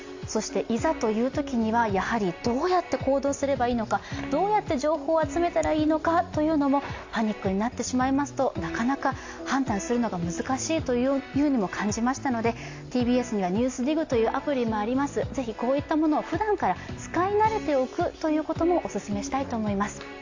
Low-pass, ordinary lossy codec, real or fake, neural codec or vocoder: 7.2 kHz; AAC, 48 kbps; real; none